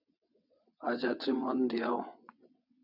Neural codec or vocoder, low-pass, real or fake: vocoder, 22.05 kHz, 80 mel bands, WaveNeXt; 5.4 kHz; fake